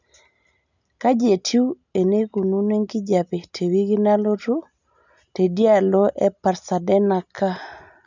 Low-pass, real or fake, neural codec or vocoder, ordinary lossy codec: 7.2 kHz; real; none; none